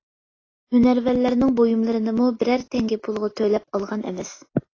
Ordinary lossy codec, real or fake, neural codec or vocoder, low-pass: AAC, 32 kbps; real; none; 7.2 kHz